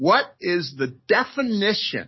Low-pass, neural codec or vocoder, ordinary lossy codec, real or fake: 7.2 kHz; codec, 44.1 kHz, 7.8 kbps, DAC; MP3, 24 kbps; fake